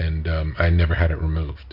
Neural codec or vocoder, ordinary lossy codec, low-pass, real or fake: none; MP3, 48 kbps; 5.4 kHz; real